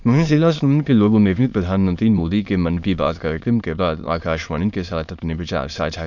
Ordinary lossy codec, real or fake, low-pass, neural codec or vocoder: none; fake; 7.2 kHz; autoencoder, 22.05 kHz, a latent of 192 numbers a frame, VITS, trained on many speakers